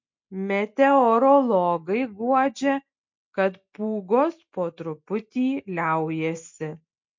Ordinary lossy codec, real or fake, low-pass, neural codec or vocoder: MP3, 48 kbps; real; 7.2 kHz; none